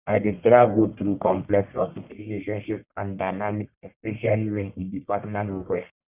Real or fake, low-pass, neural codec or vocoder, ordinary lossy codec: fake; 3.6 kHz; codec, 44.1 kHz, 1.7 kbps, Pupu-Codec; Opus, 64 kbps